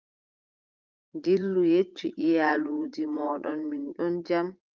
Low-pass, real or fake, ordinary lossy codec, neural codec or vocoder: 7.2 kHz; fake; Opus, 32 kbps; vocoder, 44.1 kHz, 80 mel bands, Vocos